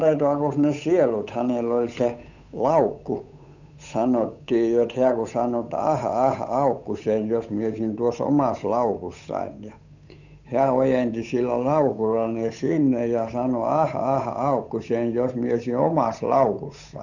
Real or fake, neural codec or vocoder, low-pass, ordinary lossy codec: fake; codec, 16 kHz, 8 kbps, FunCodec, trained on Chinese and English, 25 frames a second; 7.2 kHz; none